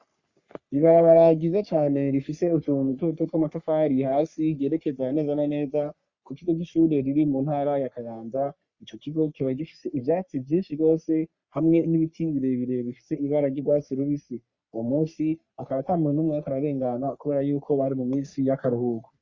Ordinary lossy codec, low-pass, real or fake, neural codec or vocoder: Opus, 64 kbps; 7.2 kHz; fake; codec, 44.1 kHz, 3.4 kbps, Pupu-Codec